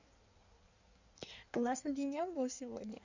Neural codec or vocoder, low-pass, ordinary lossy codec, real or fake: codec, 16 kHz in and 24 kHz out, 1.1 kbps, FireRedTTS-2 codec; 7.2 kHz; none; fake